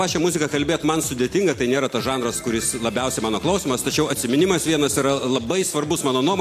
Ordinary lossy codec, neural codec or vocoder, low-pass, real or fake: AAC, 64 kbps; none; 14.4 kHz; real